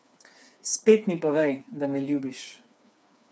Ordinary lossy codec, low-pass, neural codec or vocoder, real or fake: none; none; codec, 16 kHz, 4 kbps, FreqCodec, smaller model; fake